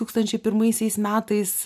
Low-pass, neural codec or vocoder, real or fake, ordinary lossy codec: 14.4 kHz; none; real; MP3, 96 kbps